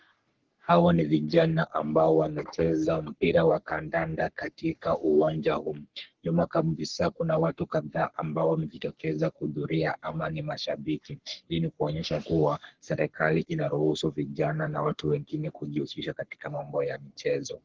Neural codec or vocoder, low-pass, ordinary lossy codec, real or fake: codec, 44.1 kHz, 3.4 kbps, Pupu-Codec; 7.2 kHz; Opus, 16 kbps; fake